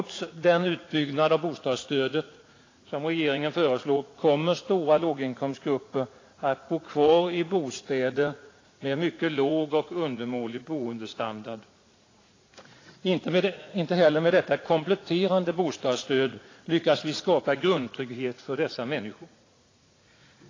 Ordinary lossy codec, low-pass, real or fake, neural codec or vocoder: AAC, 32 kbps; 7.2 kHz; fake; vocoder, 44.1 kHz, 80 mel bands, Vocos